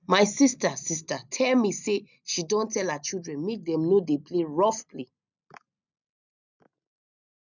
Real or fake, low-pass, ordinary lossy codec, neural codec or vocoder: real; 7.2 kHz; none; none